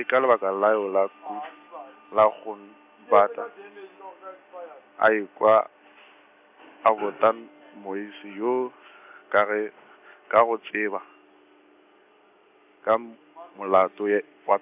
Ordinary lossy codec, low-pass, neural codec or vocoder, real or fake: AAC, 32 kbps; 3.6 kHz; none; real